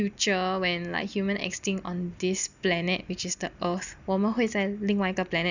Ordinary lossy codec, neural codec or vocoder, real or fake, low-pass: none; none; real; 7.2 kHz